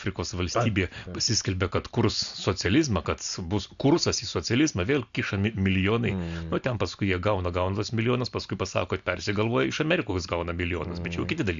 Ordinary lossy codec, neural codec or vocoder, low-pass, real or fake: AAC, 96 kbps; none; 7.2 kHz; real